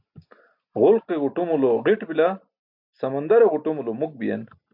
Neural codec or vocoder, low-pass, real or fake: none; 5.4 kHz; real